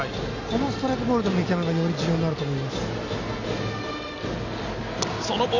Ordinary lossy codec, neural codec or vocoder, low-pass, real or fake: none; none; 7.2 kHz; real